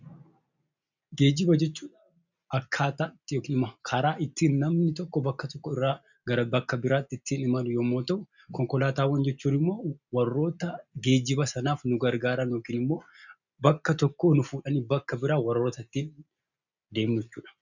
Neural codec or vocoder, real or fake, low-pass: none; real; 7.2 kHz